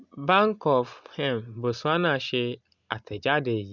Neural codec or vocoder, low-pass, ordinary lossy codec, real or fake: none; 7.2 kHz; none; real